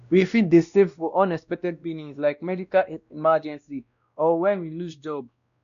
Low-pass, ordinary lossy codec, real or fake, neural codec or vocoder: 7.2 kHz; none; fake; codec, 16 kHz, 1 kbps, X-Codec, WavLM features, trained on Multilingual LibriSpeech